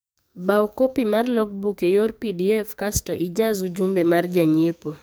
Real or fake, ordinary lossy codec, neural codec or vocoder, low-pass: fake; none; codec, 44.1 kHz, 2.6 kbps, SNAC; none